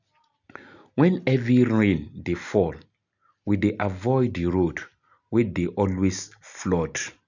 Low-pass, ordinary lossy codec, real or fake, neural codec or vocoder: 7.2 kHz; none; real; none